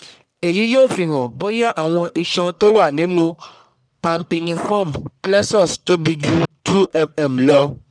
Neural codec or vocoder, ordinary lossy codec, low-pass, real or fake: codec, 44.1 kHz, 1.7 kbps, Pupu-Codec; none; 9.9 kHz; fake